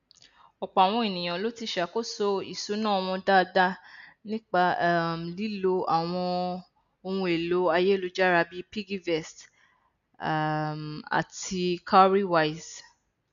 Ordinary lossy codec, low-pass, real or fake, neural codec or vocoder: none; 7.2 kHz; real; none